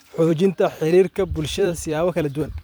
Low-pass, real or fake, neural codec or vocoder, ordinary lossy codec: none; fake; vocoder, 44.1 kHz, 128 mel bands every 256 samples, BigVGAN v2; none